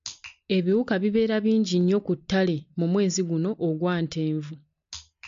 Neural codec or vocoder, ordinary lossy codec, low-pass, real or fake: none; MP3, 48 kbps; 7.2 kHz; real